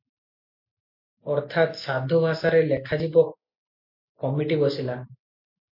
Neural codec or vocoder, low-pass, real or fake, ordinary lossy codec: none; 5.4 kHz; real; MP3, 32 kbps